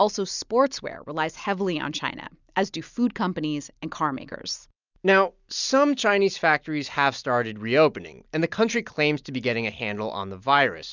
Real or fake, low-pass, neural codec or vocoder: real; 7.2 kHz; none